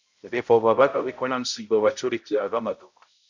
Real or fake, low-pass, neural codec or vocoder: fake; 7.2 kHz; codec, 16 kHz, 0.5 kbps, X-Codec, HuBERT features, trained on balanced general audio